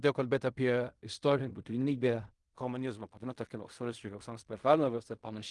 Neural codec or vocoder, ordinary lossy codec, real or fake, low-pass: codec, 16 kHz in and 24 kHz out, 0.4 kbps, LongCat-Audio-Codec, fine tuned four codebook decoder; Opus, 24 kbps; fake; 10.8 kHz